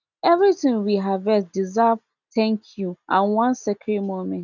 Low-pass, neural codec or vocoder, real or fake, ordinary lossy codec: 7.2 kHz; none; real; none